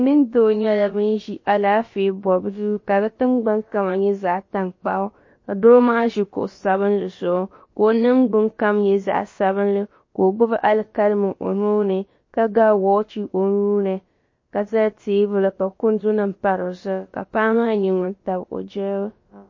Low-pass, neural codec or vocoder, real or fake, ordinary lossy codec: 7.2 kHz; codec, 16 kHz, about 1 kbps, DyCAST, with the encoder's durations; fake; MP3, 32 kbps